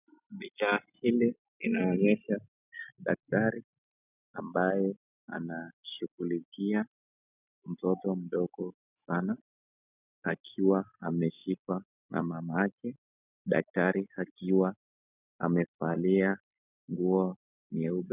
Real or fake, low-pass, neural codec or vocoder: real; 3.6 kHz; none